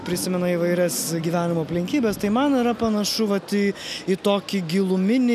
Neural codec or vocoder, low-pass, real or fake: none; 14.4 kHz; real